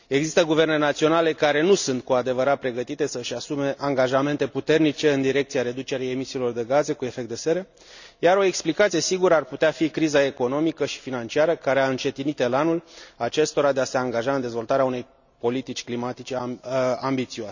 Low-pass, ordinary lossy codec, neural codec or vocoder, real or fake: 7.2 kHz; none; none; real